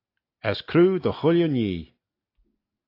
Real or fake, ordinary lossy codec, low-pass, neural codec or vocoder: real; AAC, 32 kbps; 5.4 kHz; none